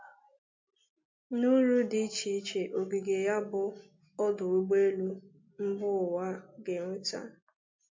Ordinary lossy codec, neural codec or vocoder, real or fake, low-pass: MP3, 48 kbps; none; real; 7.2 kHz